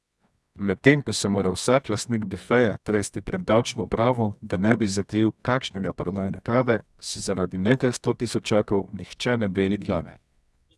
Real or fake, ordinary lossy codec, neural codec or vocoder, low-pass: fake; none; codec, 24 kHz, 0.9 kbps, WavTokenizer, medium music audio release; none